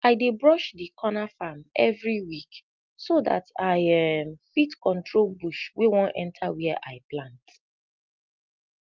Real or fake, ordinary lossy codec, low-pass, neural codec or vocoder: real; Opus, 32 kbps; 7.2 kHz; none